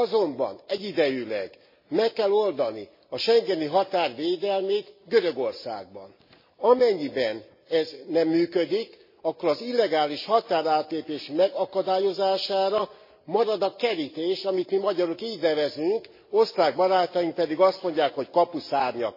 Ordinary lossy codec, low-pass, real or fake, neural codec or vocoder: MP3, 24 kbps; 5.4 kHz; real; none